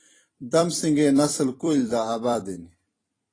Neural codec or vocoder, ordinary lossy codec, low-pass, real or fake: vocoder, 44.1 kHz, 128 mel bands every 256 samples, BigVGAN v2; AAC, 32 kbps; 9.9 kHz; fake